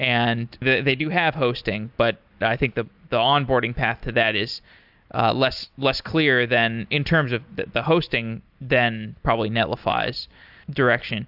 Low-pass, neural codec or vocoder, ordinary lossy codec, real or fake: 5.4 kHz; none; AAC, 48 kbps; real